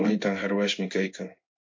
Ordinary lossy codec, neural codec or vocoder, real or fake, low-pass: MP3, 48 kbps; codec, 16 kHz in and 24 kHz out, 1 kbps, XY-Tokenizer; fake; 7.2 kHz